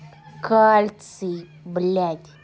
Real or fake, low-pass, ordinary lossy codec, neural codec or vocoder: real; none; none; none